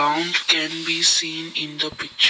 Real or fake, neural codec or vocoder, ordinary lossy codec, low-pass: real; none; none; none